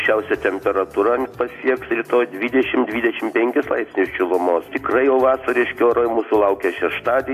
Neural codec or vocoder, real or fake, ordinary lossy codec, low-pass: none; real; AAC, 64 kbps; 14.4 kHz